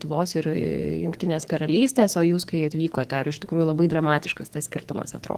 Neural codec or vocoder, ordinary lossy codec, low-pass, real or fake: codec, 44.1 kHz, 2.6 kbps, SNAC; Opus, 24 kbps; 14.4 kHz; fake